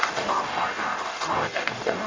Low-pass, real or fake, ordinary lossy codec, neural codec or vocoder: 7.2 kHz; fake; MP3, 48 kbps; codec, 44.1 kHz, 0.9 kbps, DAC